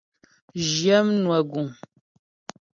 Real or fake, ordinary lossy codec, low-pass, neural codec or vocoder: real; MP3, 64 kbps; 7.2 kHz; none